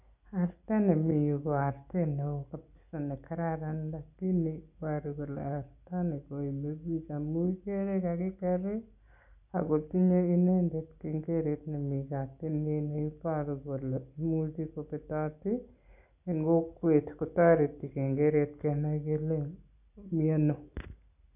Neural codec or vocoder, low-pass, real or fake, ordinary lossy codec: none; 3.6 kHz; real; none